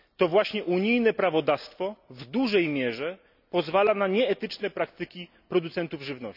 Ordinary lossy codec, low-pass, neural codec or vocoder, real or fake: none; 5.4 kHz; none; real